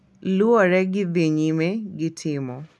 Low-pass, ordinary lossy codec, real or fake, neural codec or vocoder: none; none; real; none